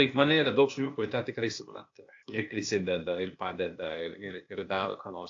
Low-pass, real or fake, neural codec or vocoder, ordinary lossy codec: 7.2 kHz; fake; codec, 16 kHz, 0.8 kbps, ZipCodec; AAC, 48 kbps